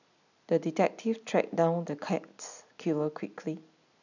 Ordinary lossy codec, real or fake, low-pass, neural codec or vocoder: none; real; 7.2 kHz; none